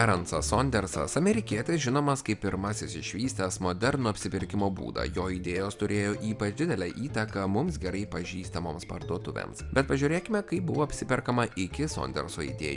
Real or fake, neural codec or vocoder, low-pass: real; none; 10.8 kHz